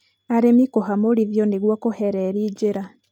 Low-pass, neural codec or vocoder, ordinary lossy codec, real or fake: 19.8 kHz; none; none; real